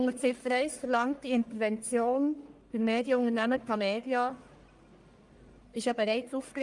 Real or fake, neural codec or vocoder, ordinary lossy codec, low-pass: fake; codec, 44.1 kHz, 1.7 kbps, Pupu-Codec; Opus, 32 kbps; 10.8 kHz